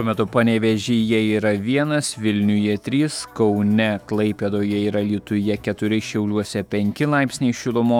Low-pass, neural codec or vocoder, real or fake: 19.8 kHz; autoencoder, 48 kHz, 128 numbers a frame, DAC-VAE, trained on Japanese speech; fake